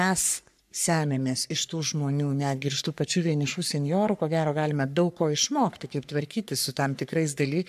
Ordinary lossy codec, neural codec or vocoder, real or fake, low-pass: AAC, 96 kbps; codec, 44.1 kHz, 3.4 kbps, Pupu-Codec; fake; 14.4 kHz